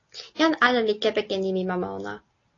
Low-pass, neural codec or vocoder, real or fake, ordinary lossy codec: 7.2 kHz; none; real; AAC, 32 kbps